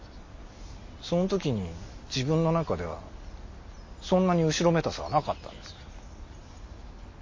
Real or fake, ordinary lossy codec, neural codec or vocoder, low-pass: real; MP3, 32 kbps; none; 7.2 kHz